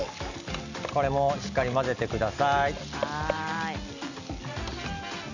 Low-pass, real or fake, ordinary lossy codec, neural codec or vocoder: 7.2 kHz; real; none; none